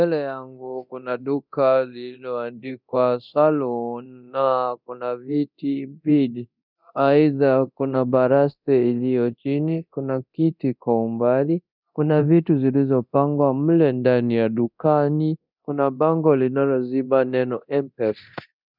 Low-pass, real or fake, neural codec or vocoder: 5.4 kHz; fake; codec, 24 kHz, 0.9 kbps, DualCodec